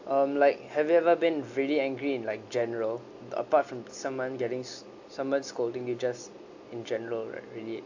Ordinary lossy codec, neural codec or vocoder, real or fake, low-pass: AAC, 48 kbps; none; real; 7.2 kHz